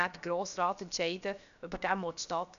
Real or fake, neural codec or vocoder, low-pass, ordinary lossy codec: fake; codec, 16 kHz, about 1 kbps, DyCAST, with the encoder's durations; 7.2 kHz; none